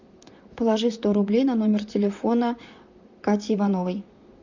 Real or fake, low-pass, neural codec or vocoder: fake; 7.2 kHz; vocoder, 44.1 kHz, 128 mel bands, Pupu-Vocoder